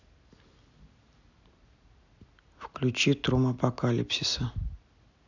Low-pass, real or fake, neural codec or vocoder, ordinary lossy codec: 7.2 kHz; real; none; none